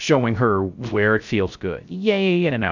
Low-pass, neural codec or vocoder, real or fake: 7.2 kHz; codec, 16 kHz, 0.3 kbps, FocalCodec; fake